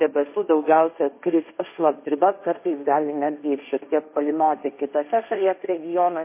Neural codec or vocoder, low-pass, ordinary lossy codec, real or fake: codec, 16 kHz, 1.1 kbps, Voila-Tokenizer; 3.6 kHz; MP3, 24 kbps; fake